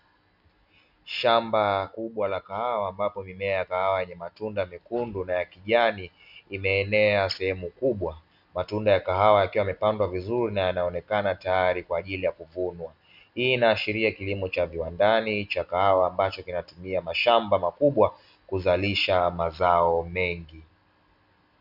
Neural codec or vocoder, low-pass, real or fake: none; 5.4 kHz; real